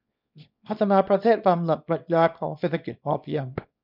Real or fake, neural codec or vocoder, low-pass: fake; codec, 24 kHz, 0.9 kbps, WavTokenizer, small release; 5.4 kHz